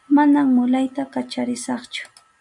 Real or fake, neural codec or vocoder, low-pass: real; none; 10.8 kHz